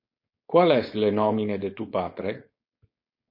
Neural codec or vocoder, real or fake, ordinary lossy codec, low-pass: codec, 16 kHz, 4.8 kbps, FACodec; fake; MP3, 32 kbps; 5.4 kHz